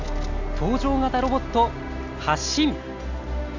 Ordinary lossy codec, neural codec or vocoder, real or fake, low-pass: Opus, 64 kbps; none; real; 7.2 kHz